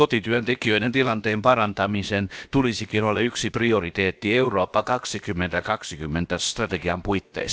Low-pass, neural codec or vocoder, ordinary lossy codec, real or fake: none; codec, 16 kHz, about 1 kbps, DyCAST, with the encoder's durations; none; fake